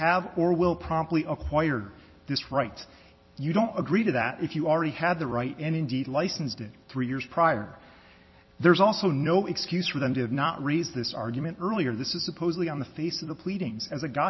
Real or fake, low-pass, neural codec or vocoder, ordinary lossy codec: fake; 7.2 kHz; vocoder, 44.1 kHz, 128 mel bands every 256 samples, BigVGAN v2; MP3, 24 kbps